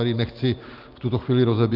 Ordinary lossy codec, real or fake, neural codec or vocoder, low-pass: Opus, 24 kbps; real; none; 5.4 kHz